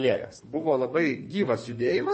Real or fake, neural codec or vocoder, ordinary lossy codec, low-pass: fake; codec, 32 kHz, 1.9 kbps, SNAC; MP3, 32 kbps; 10.8 kHz